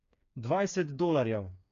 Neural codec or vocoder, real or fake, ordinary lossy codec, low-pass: codec, 16 kHz, 4 kbps, FreqCodec, smaller model; fake; AAC, 96 kbps; 7.2 kHz